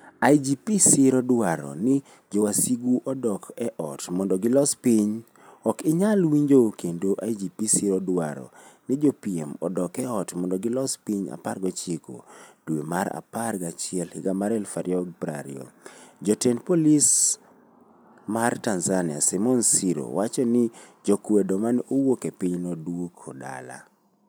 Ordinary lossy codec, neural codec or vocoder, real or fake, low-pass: none; none; real; none